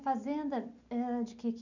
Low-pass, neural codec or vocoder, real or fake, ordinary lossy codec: 7.2 kHz; none; real; none